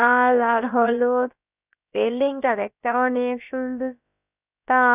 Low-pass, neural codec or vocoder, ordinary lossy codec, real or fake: 3.6 kHz; codec, 16 kHz, about 1 kbps, DyCAST, with the encoder's durations; none; fake